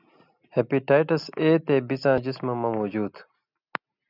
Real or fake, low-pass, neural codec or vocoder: real; 5.4 kHz; none